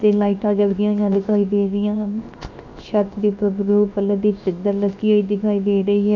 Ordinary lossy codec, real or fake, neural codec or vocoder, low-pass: none; fake; codec, 16 kHz, 0.7 kbps, FocalCodec; 7.2 kHz